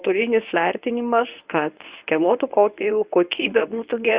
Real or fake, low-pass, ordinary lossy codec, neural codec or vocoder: fake; 3.6 kHz; Opus, 64 kbps; codec, 24 kHz, 0.9 kbps, WavTokenizer, medium speech release version 1